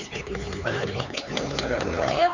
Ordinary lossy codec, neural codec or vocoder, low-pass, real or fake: Opus, 64 kbps; codec, 16 kHz, 4 kbps, X-Codec, HuBERT features, trained on LibriSpeech; 7.2 kHz; fake